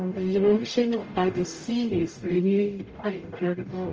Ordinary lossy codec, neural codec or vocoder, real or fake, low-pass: Opus, 24 kbps; codec, 44.1 kHz, 0.9 kbps, DAC; fake; 7.2 kHz